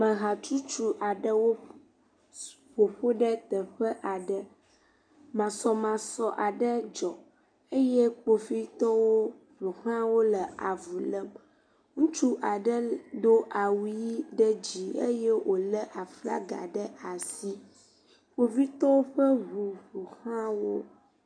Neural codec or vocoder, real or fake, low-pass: none; real; 9.9 kHz